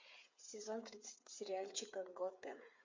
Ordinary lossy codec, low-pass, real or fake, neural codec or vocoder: MP3, 48 kbps; 7.2 kHz; fake; codec, 16 kHz, 8 kbps, FreqCodec, smaller model